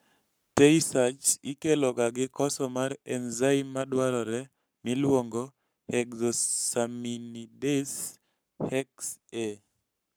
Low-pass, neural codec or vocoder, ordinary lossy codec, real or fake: none; codec, 44.1 kHz, 7.8 kbps, Pupu-Codec; none; fake